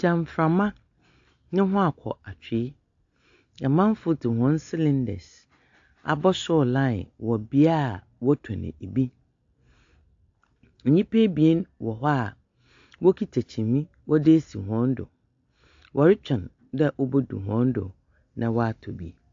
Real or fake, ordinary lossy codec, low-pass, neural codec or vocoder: real; AAC, 48 kbps; 7.2 kHz; none